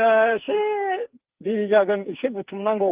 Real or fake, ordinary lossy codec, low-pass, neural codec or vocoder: fake; Opus, 32 kbps; 3.6 kHz; codec, 44.1 kHz, 2.6 kbps, SNAC